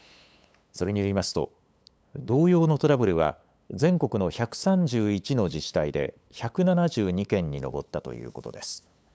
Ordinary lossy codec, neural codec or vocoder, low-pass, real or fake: none; codec, 16 kHz, 8 kbps, FunCodec, trained on LibriTTS, 25 frames a second; none; fake